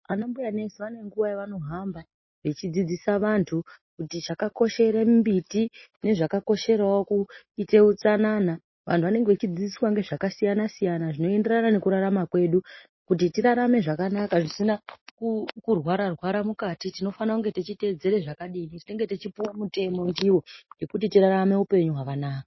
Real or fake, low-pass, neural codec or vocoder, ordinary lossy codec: real; 7.2 kHz; none; MP3, 24 kbps